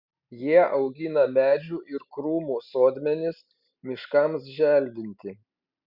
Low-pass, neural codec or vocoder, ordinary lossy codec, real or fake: 5.4 kHz; none; Opus, 64 kbps; real